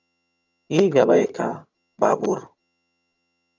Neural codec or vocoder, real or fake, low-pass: vocoder, 22.05 kHz, 80 mel bands, HiFi-GAN; fake; 7.2 kHz